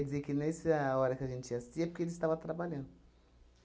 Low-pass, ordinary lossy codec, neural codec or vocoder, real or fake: none; none; none; real